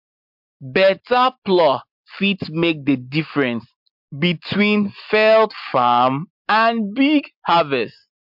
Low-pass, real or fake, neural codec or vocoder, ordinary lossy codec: 5.4 kHz; real; none; MP3, 48 kbps